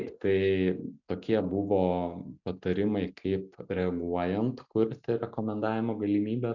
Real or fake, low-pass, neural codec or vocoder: real; 7.2 kHz; none